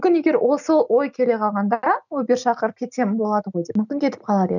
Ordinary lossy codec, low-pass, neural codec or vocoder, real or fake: none; 7.2 kHz; none; real